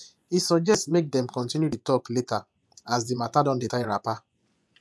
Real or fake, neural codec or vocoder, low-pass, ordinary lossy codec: fake; vocoder, 24 kHz, 100 mel bands, Vocos; none; none